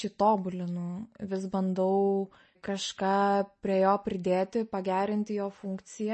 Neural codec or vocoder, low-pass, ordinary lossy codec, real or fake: none; 10.8 kHz; MP3, 32 kbps; real